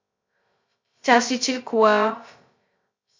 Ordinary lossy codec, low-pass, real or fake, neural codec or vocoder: MP3, 64 kbps; 7.2 kHz; fake; codec, 16 kHz, 0.2 kbps, FocalCodec